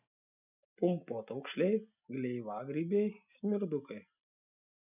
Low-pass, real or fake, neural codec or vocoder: 3.6 kHz; real; none